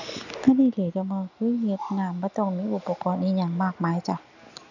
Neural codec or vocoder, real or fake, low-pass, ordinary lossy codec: none; real; 7.2 kHz; none